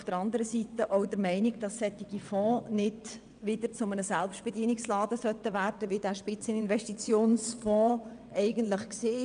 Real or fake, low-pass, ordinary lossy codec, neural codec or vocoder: fake; 9.9 kHz; none; vocoder, 22.05 kHz, 80 mel bands, WaveNeXt